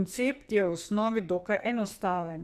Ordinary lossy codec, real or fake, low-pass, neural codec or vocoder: none; fake; 14.4 kHz; codec, 44.1 kHz, 2.6 kbps, SNAC